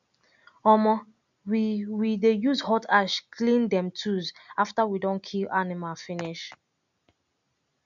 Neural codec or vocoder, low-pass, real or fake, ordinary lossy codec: none; 7.2 kHz; real; none